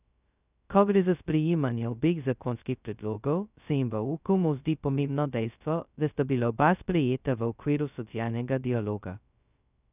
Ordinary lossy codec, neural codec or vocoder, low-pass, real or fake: none; codec, 16 kHz, 0.2 kbps, FocalCodec; 3.6 kHz; fake